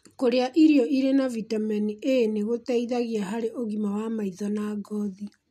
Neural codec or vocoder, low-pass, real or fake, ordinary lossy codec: none; 14.4 kHz; real; MP3, 64 kbps